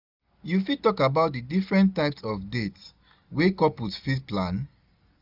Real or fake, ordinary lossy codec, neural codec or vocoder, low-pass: real; none; none; 5.4 kHz